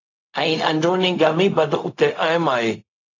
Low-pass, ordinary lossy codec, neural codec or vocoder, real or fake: 7.2 kHz; AAC, 32 kbps; codec, 16 kHz, 0.4 kbps, LongCat-Audio-Codec; fake